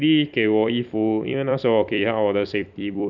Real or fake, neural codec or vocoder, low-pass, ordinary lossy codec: fake; vocoder, 44.1 kHz, 128 mel bands every 256 samples, BigVGAN v2; 7.2 kHz; none